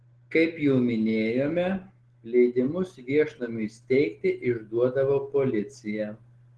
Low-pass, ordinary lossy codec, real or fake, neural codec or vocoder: 10.8 kHz; Opus, 16 kbps; real; none